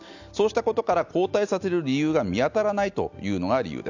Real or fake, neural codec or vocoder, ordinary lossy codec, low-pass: real; none; none; 7.2 kHz